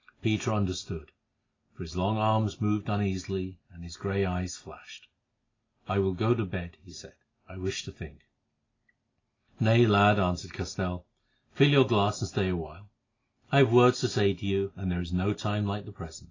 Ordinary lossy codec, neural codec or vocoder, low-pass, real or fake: AAC, 32 kbps; none; 7.2 kHz; real